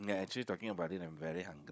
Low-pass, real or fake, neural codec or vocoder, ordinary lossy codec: none; fake; codec, 16 kHz, 16 kbps, FunCodec, trained on Chinese and English, 50 frames a second; none